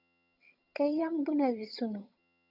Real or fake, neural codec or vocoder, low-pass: fake; vocoder, 22.05 kHz, 80 mel bands, HiFi-GAN; 5.4 kHz